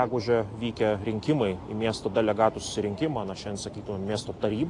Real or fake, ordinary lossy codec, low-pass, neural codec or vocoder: real; AAC, 48 kbps; 10.8 kHz; none